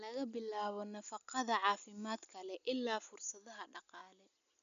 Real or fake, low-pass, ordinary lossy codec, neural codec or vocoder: real; 7.2 kHz; none; none